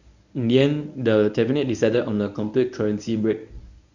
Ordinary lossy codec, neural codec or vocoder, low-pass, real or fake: none; codec, 24 kHz, 0.9 kbps, WavTokenizer, medium speech release version 1; 7.2 kHz; fake